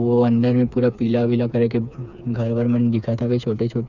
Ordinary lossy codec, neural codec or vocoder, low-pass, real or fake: none; codec, 16 kHz, 4 kbps, FreqCodec, smaller model; 7.2 kHz; fake